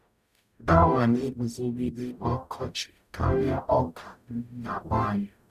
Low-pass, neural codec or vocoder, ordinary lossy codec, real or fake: 14.4 kHz; codec, 44.1 kHz, 0.9 kbps, DAC; none; fake